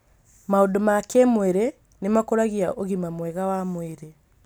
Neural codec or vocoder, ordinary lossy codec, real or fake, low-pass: none; none; real; none